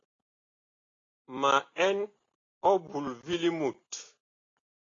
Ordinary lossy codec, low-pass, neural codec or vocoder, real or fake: AAC, 32 kbps; 7.2 kHz; none; real